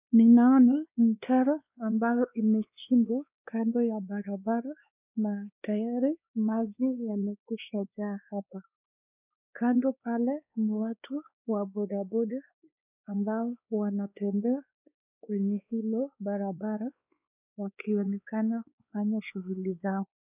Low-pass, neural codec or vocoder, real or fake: 3.6 kHz; codec, 16 kHz, 2 kbps, X-Codec, WavLM features, trained on Multilingual LibriSpeech; fake